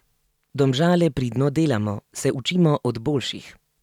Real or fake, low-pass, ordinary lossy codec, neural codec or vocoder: real; 19.8 kHz; none; none